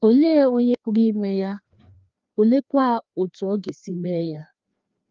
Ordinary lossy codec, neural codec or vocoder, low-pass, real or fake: Opus, 32 kbps; codec, 16 kHz, 2 kbps, FreqCodec, larger model; 7.2 kHz; fake